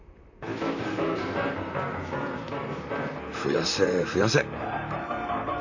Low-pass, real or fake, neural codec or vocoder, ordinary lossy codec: 7.2 kHz; fake; vocoder, 44.1 kHz, 128 mel bands, Pupu-Vocoder; none